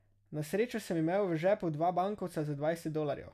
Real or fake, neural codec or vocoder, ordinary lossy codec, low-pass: real; none; none; 14.4 kHz